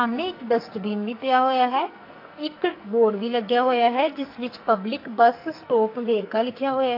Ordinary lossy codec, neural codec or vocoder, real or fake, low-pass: AAC, 32 kbps; codec, 44.1 kHz, 2.6 kbps, SNAC; fake; 5.4 kHz